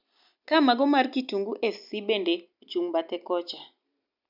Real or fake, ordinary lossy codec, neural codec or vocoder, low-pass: real; none; none; 5.4 kHz